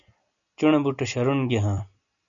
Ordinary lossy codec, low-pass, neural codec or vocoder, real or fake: MP3, 96 kbps; 7.2 kHz; none; real